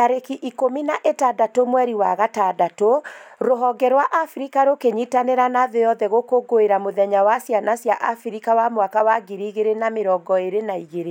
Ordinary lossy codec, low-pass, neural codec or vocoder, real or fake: none; 19.8 kHz; none; real